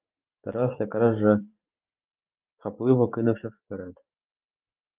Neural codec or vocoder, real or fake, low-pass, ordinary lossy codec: vocoder, 44.1 kHz, 128 mel bands every 512 samples, BigVGAN v2; fake; 3.6 kHz; Opus, 32 kbps